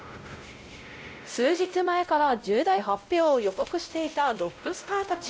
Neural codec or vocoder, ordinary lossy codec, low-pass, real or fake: codec, 16 kHz, 0.5 kbps, X-Codec, WavLM features, trained on Multilingual LibriSpeech; none; none; fake